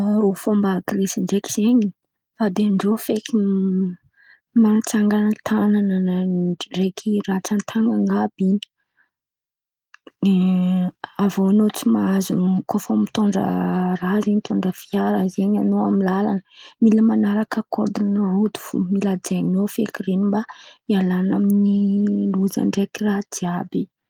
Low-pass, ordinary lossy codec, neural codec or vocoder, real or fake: 19.8 kHz; Opus, 24 kbps; none; real